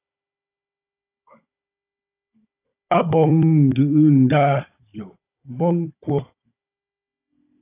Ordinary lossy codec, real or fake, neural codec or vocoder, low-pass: AAC, 24 kbps; fake; codec, 16 kHz, 16 kbps, FunCodec, trained on Chinese and English, 50 frames a second; 3.6 kHz